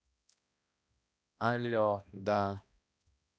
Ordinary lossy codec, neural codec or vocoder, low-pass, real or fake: none; codec, 16 kHz, 1 kbps, X-Codec, HuBERT features, trained on balanced general audio; none; fake